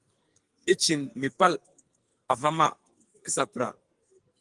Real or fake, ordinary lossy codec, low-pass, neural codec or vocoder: fake; Opus, 24 kbps; 10.8 kHz; codec, 44.1 kHz, 2.6 kbps, SNAC